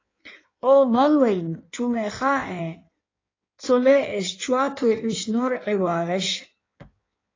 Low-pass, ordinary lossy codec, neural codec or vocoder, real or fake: 7.2 kHz; AAC, 32 kbps; codec, 16 kHz in and 24 kHz out, 1.1 kbps, FireRedTTS-2 codec; fake